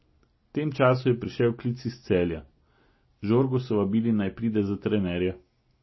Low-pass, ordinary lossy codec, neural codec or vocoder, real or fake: 7.2 kHz; MP3, 24 kbps; none; real